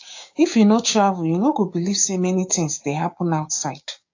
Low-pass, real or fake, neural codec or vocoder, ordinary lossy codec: 7.2 kHz; fake; codec, 16 kHz, 6 kbps, DAC; AAC, 48 kbps